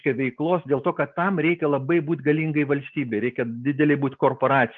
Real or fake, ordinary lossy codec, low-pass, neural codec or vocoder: real; Opus, 24 kbps; 7.2 kHz; none